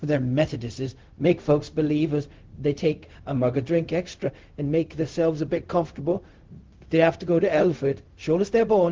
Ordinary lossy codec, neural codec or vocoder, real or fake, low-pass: Opus, 16 kbps; codec, 16 kHz, 0.4 kbps, LongCat-Audio-Codec; fake; 7.2 kHz